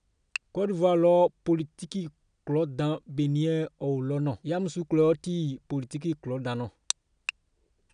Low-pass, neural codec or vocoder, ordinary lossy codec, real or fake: 9.9 kHz; none; none; real